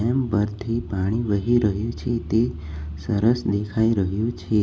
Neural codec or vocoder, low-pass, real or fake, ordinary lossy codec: none; none; real; none